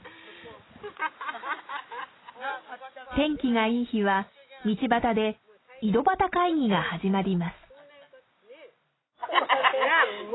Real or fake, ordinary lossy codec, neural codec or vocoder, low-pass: real; AAC, 16 kbps; none; 7.2 kHz